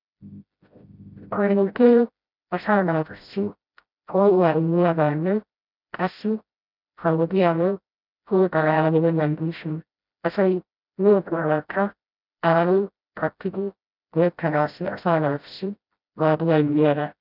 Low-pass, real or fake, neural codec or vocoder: 5.4 kHz; fake; codec, 16 kHz, 0.5 kbps, FreqCodec, smaller model